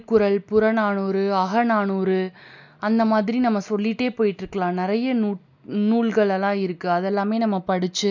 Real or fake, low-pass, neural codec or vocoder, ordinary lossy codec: real; 7.2 kHz; none; none